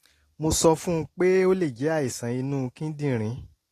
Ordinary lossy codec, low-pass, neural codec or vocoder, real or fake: AAC, 48 kbps; 14.4 kHz; none; real